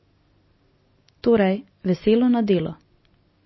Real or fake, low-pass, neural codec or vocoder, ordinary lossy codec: real; 7.2 kHz; none; MP3, 24 kbps